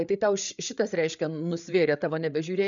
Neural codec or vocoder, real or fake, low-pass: codec, 16 kHz, 8 kbps, FreqCodec, larger model; fake; 7.2 kHz